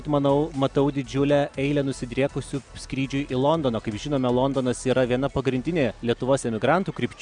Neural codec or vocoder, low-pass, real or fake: none; 9.9 kHz; real